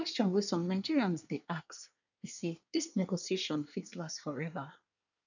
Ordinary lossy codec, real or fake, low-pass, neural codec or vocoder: none; fake; 7.2 kHz; codec, 24 kHz, 1 kbps, SNAC